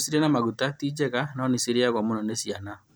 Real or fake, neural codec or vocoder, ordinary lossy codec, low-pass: real; none; none; none